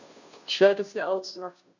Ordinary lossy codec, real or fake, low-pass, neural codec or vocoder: none; fake; 7.2 kHz; codec, 16 kHz, 0.5 kbps, FunCodec, trained on Chinese and English, 25 frames a second